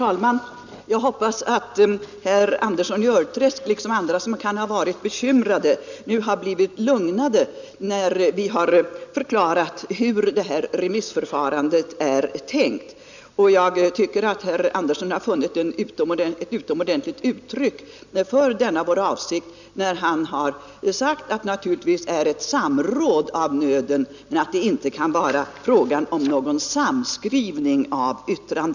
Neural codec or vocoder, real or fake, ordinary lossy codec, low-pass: none; real; none; 7.2 kHz